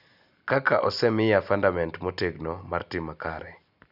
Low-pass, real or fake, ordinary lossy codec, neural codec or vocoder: 5.4 kHz; real; none; none